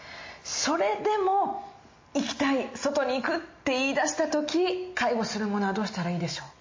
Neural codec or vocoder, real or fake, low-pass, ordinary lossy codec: none; real; 7.2 kHz; MP3, 32 kbps